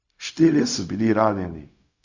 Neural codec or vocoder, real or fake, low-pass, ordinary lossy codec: codec, 16 kHz, 0.4 kbps, LongCat-Audio-Codec; fake; 7.2 kHz; Opus, 64 kbps